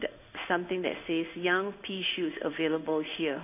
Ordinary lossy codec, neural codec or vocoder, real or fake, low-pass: none; codec, 16 kHz in and 24 kHz out, 1 kbps, XY-Tokenizer; fake; 3.6 kHz